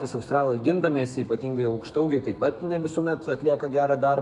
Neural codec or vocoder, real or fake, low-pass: codec, 32 kHz, 1.9 kbps, SNAC; fake; 10.8 kHz